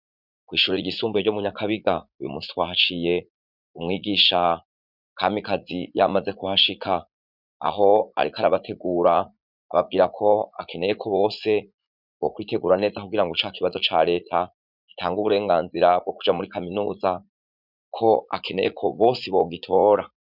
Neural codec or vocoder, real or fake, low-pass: vocoder, 44.1 kHz, 80 mel bands, Vocos; fake; 5.4 kHz